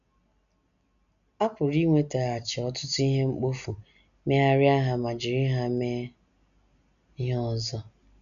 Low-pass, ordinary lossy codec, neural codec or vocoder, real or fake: 7.2 kHz; none; none; real